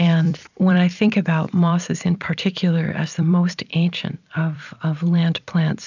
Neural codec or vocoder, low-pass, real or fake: vocoder, 44.1 kHz, 128 mel bands every 256 samples, BigVGAN v2; 7.2 kHz; fake